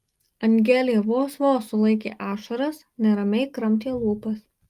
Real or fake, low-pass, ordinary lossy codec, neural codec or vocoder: real; 14.4 kHz; Opus, 32 kbps; none